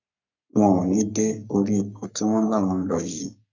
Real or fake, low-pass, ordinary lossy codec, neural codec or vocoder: fake; 7.2 kHz; none; codec, 44.1 kHz, 3.4 kbps, Pupu-Codec